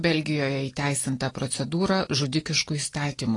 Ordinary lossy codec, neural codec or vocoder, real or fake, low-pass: AAC, 32 kbps; none; real; 10.8 kHz